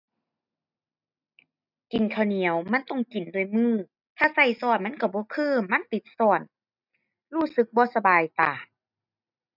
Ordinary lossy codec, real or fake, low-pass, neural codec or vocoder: none; real; 5.4 kHz; none